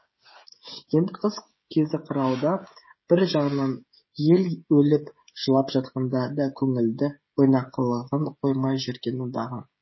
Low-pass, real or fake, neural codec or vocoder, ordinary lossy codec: 7.2 kHz; fake; codec, 16 kHz, 16 kbps, FreqCodec, smaller model; MP3, 24 kbps